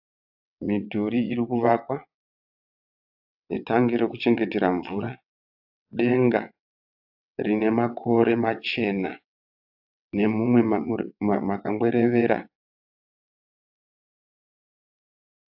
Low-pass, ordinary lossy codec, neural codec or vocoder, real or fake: 5.4 kHz; AAC, 48 kbps; vocoder, 22.05 kHz, 80 mel bands, WaveNeXt; fake